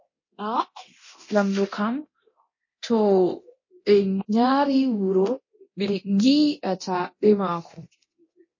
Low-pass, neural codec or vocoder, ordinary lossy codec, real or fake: 7.2 kHz; codec, 24 kHz, 0.9 kbps, DualCodec; MP3, 32 kbps; fake